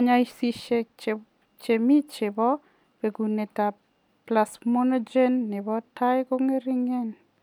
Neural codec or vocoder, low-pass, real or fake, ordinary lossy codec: none; 19.8 kHz; real; none